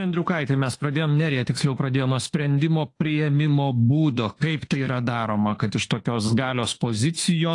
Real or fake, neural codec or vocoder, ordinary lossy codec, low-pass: fake; autoencoder, 48 kHz, 32 numbers a frame, DAC-VAE, trained on Japanese speech; AAC, 48 kbps; 10.8 kHz